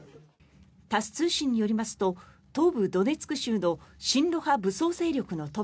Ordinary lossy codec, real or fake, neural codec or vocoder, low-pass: none; real; none; none